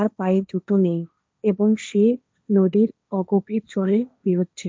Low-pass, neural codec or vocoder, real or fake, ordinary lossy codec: none; codec, 16 kHz, 1.1 kbps, Voila-Tokenizer; fake; none